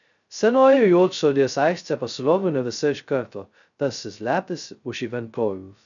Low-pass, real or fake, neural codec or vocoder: 7.2 kHz; fake; codec, 16 kHz, 0.2 kbps, FocalCodec